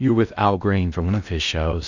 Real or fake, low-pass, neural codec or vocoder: fake; 7.2 kHz; codec, 16 kHz in and 24 kHz out, 0.6 kbps, FocalCodec, streaming, 2048 codes